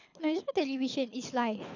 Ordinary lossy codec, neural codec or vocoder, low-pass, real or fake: none; codec, 24 kHz, 6 kbps, HILCodec; 7.2 kHz; fake